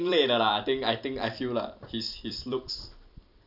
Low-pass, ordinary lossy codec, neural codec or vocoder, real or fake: 5.4 kHz; none; vocoder, 44.1 kHz, 128 mel bands every 256 samples, BigVGAN v2; fake